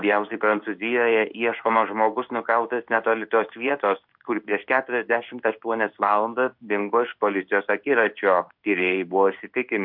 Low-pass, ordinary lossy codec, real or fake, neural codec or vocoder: 5.4 kHz; MP3, 48 kbps; fake; codec, 16 kHz in and 24 kHz out, 1 kbps, XY-Tokenizer